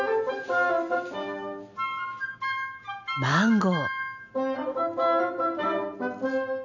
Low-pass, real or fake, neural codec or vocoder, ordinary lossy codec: 7.2 kHz; real; none; none